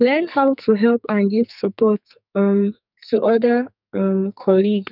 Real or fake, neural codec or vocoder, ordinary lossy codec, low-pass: fake; codec, 44.1 kHz, 2.6 kbps, SNAC; none; 5.4 kHz